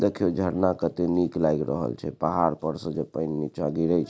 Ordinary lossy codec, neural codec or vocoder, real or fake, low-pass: none; none; real; none